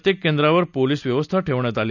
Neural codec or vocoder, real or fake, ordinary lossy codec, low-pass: none; real; none; 7.2 kHz